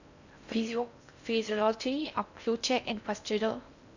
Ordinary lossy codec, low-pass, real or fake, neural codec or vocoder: none; 7.2 kHz; fake; codec, 16 kHz in and 24 kHz out, 0.6 kbps, FocalCodec, streaming, 4096 codes